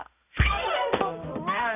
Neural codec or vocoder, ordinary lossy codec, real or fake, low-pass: codec, 16 kHz, 0.5 kbps, X-Codec, HuBERT features, trained on balanced general audio; none; fake; 3.6 kHz